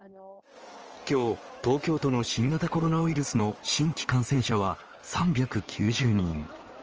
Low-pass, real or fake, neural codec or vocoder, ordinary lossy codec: 7.2 kHz; fake; codec, 16 kHz in and 24 kHz out, 2.2 kbps, FireRedTTS-2 codec; Opus, 24 kbps